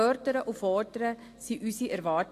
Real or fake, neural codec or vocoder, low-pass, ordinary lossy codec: real; none; 14.4 kHz; AAC, 48 kbps